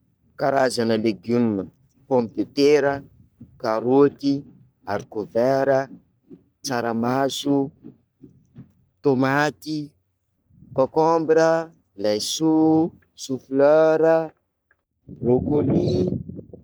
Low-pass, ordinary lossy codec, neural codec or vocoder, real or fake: none; none; codec, 44.1 kHz, 3.4 kbps, Pupu-Codec; fake